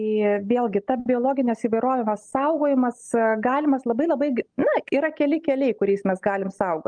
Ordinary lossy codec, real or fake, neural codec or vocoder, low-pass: Opus, 32 kbps; real; none; 9.9 kHz